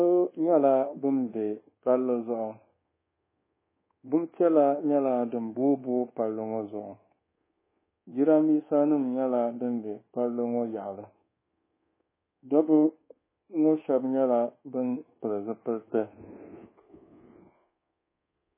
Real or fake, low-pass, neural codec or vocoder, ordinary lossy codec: fake; 3.6 kHz; codec, 24 kHz, 1.2 kbps, DualCodec; MP3, 16 kbps